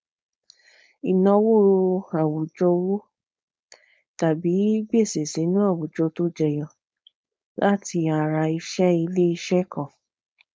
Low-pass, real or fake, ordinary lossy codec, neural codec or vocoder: none; fake; none; codec, 16 kHz, 4.8 kbps, FACodec